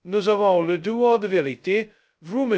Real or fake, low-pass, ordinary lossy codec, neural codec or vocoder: fake; none; none; codec, 16 kHz, 0.2 kbps, FocalCodec